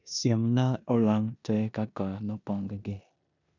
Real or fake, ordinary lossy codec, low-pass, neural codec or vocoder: fake; none; 7.2 kHz; codec, 16 kHz in and 24 kHz out, 0.9 kbps, LongCat-Audio-Codec, four codebook decoder